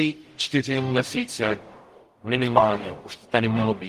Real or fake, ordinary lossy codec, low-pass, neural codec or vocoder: fake; Opus, 24 kbps; 14.4 kHz; codec, 44.1 kHz, 0.9 kbps, DAC